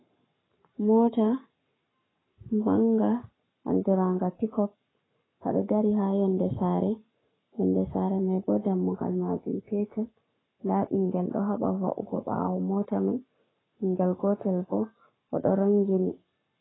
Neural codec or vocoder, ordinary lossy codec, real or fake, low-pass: codec, 44.1 kHz, 7.8 kbps, Pupu-Codec; AAC, 16 kbps; fake; 7.2 kHz